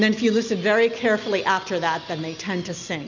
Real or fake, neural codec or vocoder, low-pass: real; none; 7.2 kHz